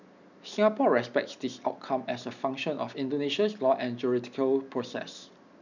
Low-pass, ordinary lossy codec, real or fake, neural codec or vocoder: 7.2 kHz; none; real; none